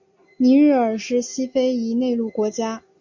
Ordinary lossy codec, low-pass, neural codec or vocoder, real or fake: MP3, 48 kbps; 7.2 kHz; none; real